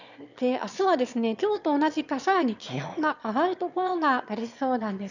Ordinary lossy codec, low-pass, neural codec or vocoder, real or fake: none; 7.2 kHz; autoencoder, 22.05 kHz, a latent of 192 numbers a frame, VITS, trained on one speaker; fake